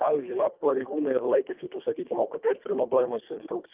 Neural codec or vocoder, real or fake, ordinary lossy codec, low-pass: codec, 24 kHz, 1.5 kbps, HILCodec; fake; Opus, 24 kbps; 3.6 kHz